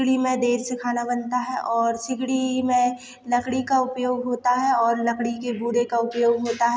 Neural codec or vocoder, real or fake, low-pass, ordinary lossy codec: none; real; none; none